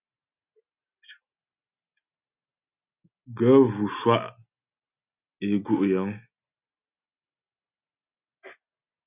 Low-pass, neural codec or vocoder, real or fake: 3.6 kHz; none; real